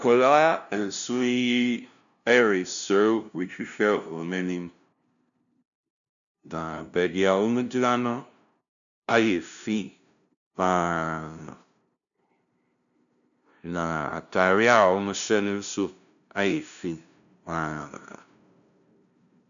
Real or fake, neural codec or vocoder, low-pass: fake; codec, 16 kHz, 0.5 kbps, FunCodec, trained on LibriTTS, 25 frames a second; 7.2 kHz